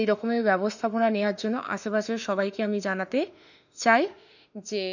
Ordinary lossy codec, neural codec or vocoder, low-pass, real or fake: none; autoencoder, 48 kHz, 32 numbers a frame, DAC-VAE, trained on Japanese speech; 7.2 kHz; fake